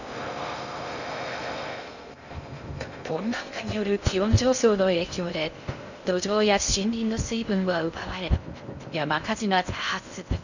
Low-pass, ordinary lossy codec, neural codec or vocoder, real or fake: 7.2 kHz; none; codec, 16 kHz in and 24 kHz out, 0.6 kbps, FocalCodec, streaming, 2048 codes; fake